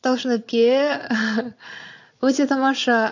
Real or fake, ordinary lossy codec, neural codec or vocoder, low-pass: fake; AAC, 48 kbps; vocoder, 22.05 kHz, 80 mel bands, Vocos; 7.2 kHz